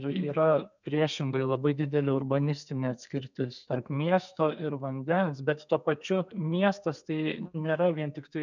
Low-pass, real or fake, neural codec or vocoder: 7.2 kHz; fake; codec, 32 kHz, 1.9 kbps, SNAC